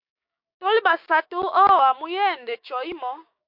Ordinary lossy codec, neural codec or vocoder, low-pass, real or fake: MP3, 48 kbps; codec, 16 kHz, 6 kbps, DAC; 5.4 kHz; fake